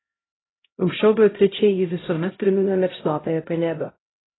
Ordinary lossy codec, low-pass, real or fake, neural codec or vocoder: AAC, 16 kbps; 7.2 kHz; fake; codec, 16 kHz, 0.5 kbps, X-Codec, HuBERT features, trained on LibriSpeech